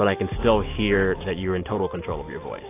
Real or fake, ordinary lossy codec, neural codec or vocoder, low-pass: real; AAC, 24 kbps; none; 3.6 kHz